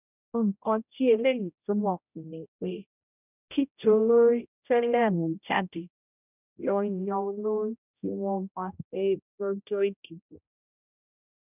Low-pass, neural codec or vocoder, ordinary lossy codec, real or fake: 3.6 kHz; codec, 16 kHz, 0.5 kbps, X-Codec, HuBERT features, trained on general audio; none; fake